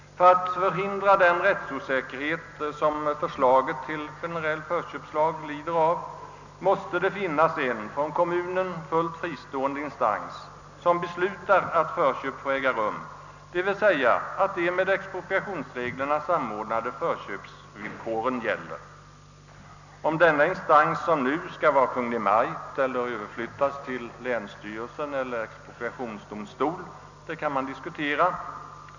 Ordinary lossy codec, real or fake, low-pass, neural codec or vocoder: none; real; 7.2 kHz; none